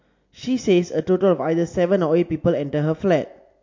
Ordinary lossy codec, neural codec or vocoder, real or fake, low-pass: MP3, 48 kbps; none; real; 7.2 kHz